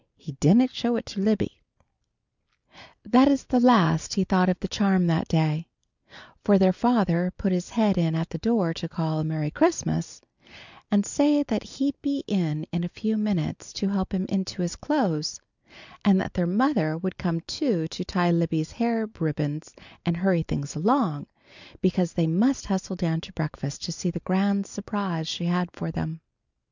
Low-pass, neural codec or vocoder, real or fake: 7.2 kHz; none; real